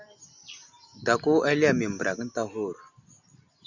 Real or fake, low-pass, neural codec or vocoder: real; 7.2 kHz; none